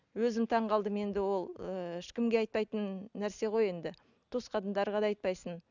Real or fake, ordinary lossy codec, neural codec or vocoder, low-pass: real; none; none; 7.2 kHz